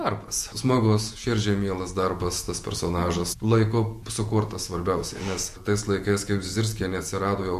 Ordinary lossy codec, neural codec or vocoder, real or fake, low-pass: MP3, 64 kbps; vocoder, 48 kHz, 128 mel bands, Vocos; fake; 14.4 kHz